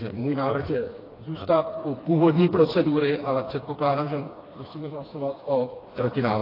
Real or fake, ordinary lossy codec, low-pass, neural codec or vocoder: fake; AAC, 24 kbps; 5.4 kHz; codec, 16 kHz, 2 kbps, FreqCodec, smaller model